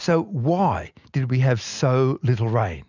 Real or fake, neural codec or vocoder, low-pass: real; none; 7.2 kHz